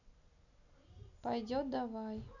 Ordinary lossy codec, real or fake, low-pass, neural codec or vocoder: none; real; 7.2 kHz; none